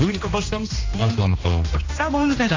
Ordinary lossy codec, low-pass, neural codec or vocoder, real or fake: AAC, 32 kbps; 7.2 kHz; codec, 16 kHz, 1 kbps, X-Codec, HuBERT features, trained on general audio; fake